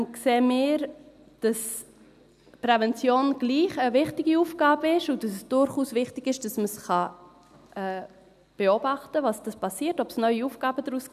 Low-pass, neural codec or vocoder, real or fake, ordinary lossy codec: 14.4 kHz; none; real; none